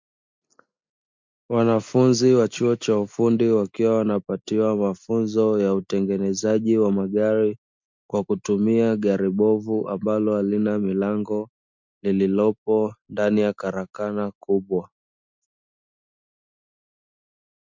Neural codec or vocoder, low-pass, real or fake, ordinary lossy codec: none; 7.2 kHz; real; MP3, 64 kbps